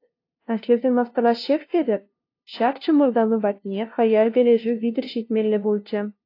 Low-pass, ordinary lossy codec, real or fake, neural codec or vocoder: 5.4 kHz; AAC, 32 kbps; fake; codec, 16 kHz, 0.5 kbps, FunCodec, trained on LibriTTS, 25 frames a second